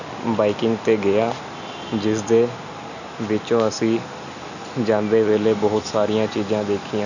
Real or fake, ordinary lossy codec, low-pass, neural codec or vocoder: real; none; 7.2 kHz; none